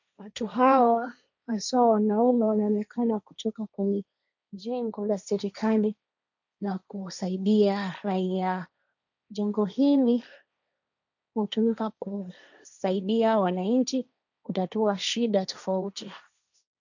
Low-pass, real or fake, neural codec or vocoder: 7.2 kHz; fake; codec, 16 kHz, 1.1 kbps, Voila-Tokenizer